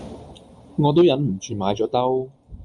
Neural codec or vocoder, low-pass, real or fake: none; 10.8 kHz; real